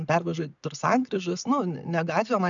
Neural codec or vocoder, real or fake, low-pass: none; real; 7.2 kHz